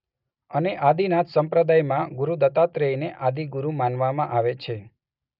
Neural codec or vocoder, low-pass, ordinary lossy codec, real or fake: none; 5.4 kHz; none; real